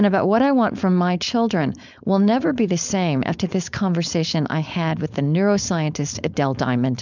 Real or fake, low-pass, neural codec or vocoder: fake; 7.2 kHz; codec, 16 kHz, 4.8 kbps, FACodec